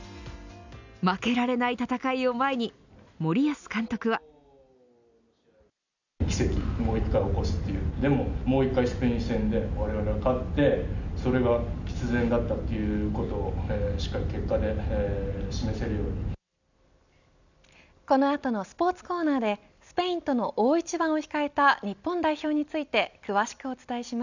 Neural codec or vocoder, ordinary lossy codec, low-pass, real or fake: none; none; 7.2 kHz; real